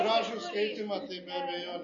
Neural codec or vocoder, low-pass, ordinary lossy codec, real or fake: none; 7.2 kHz; MP3, 32 kbps; real